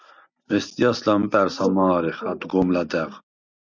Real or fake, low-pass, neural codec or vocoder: real; 7.2 kHz; none